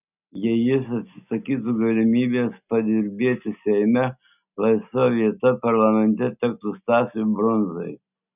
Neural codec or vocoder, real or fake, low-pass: none; real; 3.6 kHz